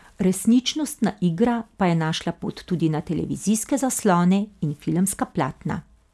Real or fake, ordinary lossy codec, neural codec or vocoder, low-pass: real; none; none; none